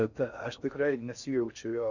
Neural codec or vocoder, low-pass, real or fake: codec, 16 kHz in and 24 kHz out, 0.6 kbps, FocalCodec, streaming, 4096 codes; 7.2 kHz; fake